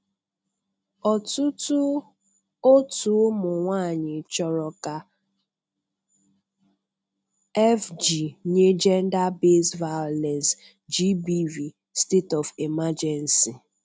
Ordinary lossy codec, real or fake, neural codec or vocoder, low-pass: none; real; none; none